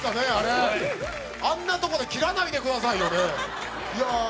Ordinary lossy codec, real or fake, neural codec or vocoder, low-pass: none; real; none; none